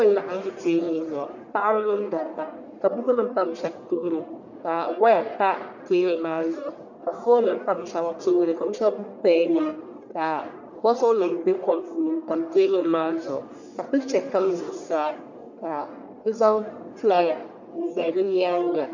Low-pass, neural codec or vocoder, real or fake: 7.2 kHz; codec, 44.1 kHz, 1.7 kbps, Pupu-Codec; fake